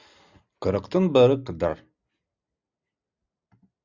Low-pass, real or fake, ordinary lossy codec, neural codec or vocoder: 7.2 kHz; real; Opus, 64 kbps; none